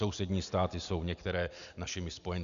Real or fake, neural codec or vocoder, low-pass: real; none; 7.2 kHz